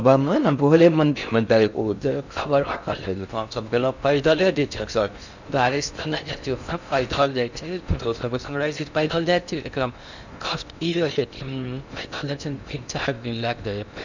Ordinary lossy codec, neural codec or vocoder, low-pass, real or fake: none; codec, 16 kHz in and 24 kHz out, 0.6 kbps, FocalCodec, streaming, 4096 codes; 7.2 kHz; fake